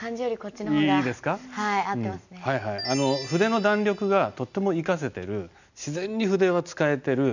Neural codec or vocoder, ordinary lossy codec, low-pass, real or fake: none; none; 7.2 kHz; real